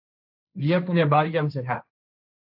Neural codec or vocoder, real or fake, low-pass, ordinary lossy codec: codec, 16 kHz, 1.1 kbps, Voila-Tokenizer; fake; 5.4 kHz; AAC, 48 kbps